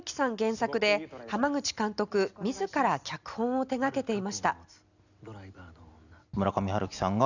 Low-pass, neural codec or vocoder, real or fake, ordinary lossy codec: 7.2 kHz; none; real; none